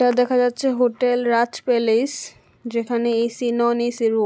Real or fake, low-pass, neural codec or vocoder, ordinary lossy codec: real; none; none; none